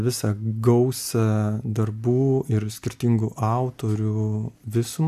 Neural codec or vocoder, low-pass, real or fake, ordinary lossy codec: none; 14.4 kHz; real; AAC, 96 kbps